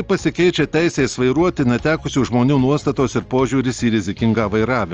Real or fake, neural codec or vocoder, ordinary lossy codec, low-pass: real; none; Opus, 24 kbps; 7.2 kHz